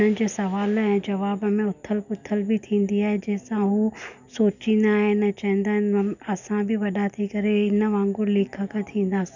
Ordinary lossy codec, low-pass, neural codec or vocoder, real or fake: none; 7.2 kHz; none; real